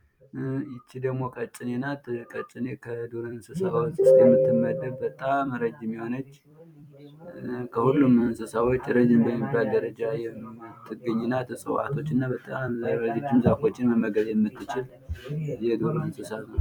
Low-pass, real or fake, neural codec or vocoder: 19.8 kHz; fake; vocoder, 44.1 kHz, 128 mel bands every 256 samples, BigVGAN v2